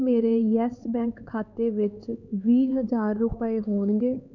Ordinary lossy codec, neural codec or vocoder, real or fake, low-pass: none; codec, 16 kHz, 4 kbps, X-Codec, HuBERT features, trained on LibriSpeech; fake; 7.2 kHz